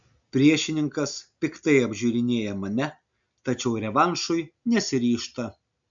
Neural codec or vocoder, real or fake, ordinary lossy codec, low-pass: none; real; MP3, 64 kbps; 7.2 kHz